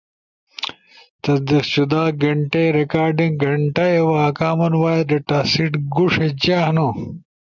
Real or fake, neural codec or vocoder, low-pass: real; none; 7.2 kHz